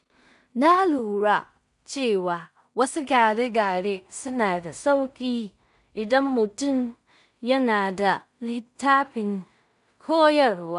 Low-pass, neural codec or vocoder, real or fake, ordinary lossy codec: 10.8 kHz; codec, 16 kHz in and 24 kHz out, 0.4 kbps, LongCat-Audio-Codec, two codebook decoder; fake; none